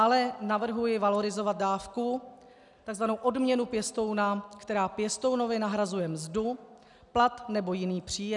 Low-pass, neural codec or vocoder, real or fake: 10.8 kHz; none; real